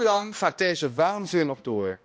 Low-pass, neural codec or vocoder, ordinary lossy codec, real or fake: none; codec, 16 kHz, 1 kbps, X-Codec, HuBERT features, trained on balanced general audio; none; fake